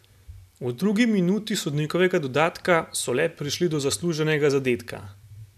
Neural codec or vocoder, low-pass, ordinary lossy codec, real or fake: none; 14.4 kHz; none; real